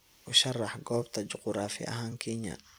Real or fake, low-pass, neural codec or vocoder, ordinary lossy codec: fake; none; vocoder, 44.1 kHz, 128 mel bands every 256 samples, BigVGAN v2; none